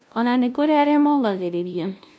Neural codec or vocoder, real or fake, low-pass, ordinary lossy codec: codec, 16 kHz, 0.5 kbps, FunCodec, trained on LibriTTS, 25 frames a second; fake; none; none